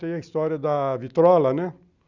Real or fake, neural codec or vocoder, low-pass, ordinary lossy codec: real; none; 7.2 kHz; none